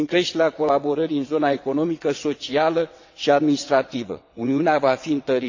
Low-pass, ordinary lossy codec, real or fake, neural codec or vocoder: 7.2 kHz; AAC, 48 kbps; fake; vocoder, 22.05 kHz, 80 mel bands, WaveNeXt